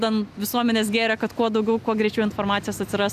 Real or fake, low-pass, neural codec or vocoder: real; 14.4 kHz; none